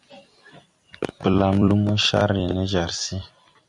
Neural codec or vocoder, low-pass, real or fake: vocoder, 44.1 kHz, 128 mel bands every 256 samples, BigVGAN v2; 10.8 kHz; fake